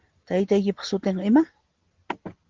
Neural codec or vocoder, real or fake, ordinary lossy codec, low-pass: none; real; Opus, 16 kbps; 7.2 kHz